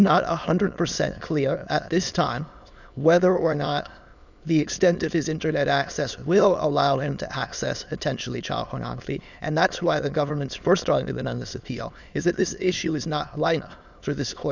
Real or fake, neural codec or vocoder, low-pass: fake; autoencoder, 22.05 kHz, a latent of 192 numbers a frame, VITS, trained on many speakers; 7.2 kHz